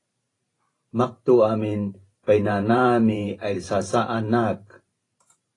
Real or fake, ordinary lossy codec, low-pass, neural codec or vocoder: fake; AAC, 32 kbps; 10.8 kHz; vocoder, 44.1 kHz, 128 mel bands every 512 samples, BigVGAN v2